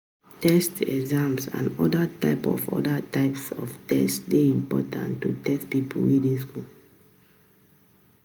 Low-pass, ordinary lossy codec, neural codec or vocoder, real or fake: none; none; none; real